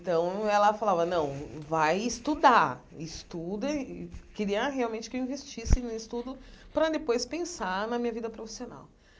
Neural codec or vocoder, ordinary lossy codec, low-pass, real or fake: none; none; none; real